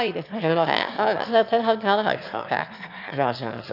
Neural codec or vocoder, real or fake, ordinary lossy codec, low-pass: autoencoder, 22.05 kHz, a latent of 192 numbers a frame, VITS, trained on one speaker; fake; none; 5.4 kHz